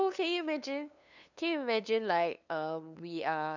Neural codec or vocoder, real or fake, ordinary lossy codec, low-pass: codec, 16 kHz, 2 kbps, FunCodec, trained on LibriTTS, 25 frames a second; fake; none; 7.2 kHz